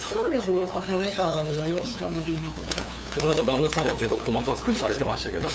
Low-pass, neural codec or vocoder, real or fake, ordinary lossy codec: none; codec, 16 kHz, 2 kbps, FunCodec, trained on LibriTTS, 25 frames a second; fake; none